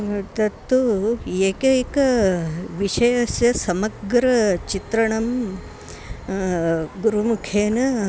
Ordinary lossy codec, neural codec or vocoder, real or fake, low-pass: none; none; real; none